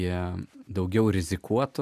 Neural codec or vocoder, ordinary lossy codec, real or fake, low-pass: none; MP3, 96 kbps; real; 14.4 kHz